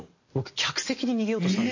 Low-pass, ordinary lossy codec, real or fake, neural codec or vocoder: 7.2 kHz; MP3, 32 kbps; real; none